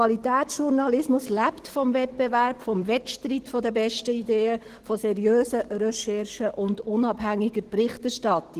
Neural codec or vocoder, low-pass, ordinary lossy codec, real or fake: codec, 44.1 kHz, 7.8 kbps, DAC; 14.4 kHz; Opus, 16 kbps; fake